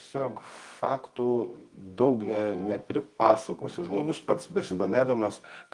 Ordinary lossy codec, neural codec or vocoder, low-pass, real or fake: Opus, 24 kbps; codec, 24 kHz, 0.9 kbps, WavTokenizer, medium music audio release; 10.8 kHz; fake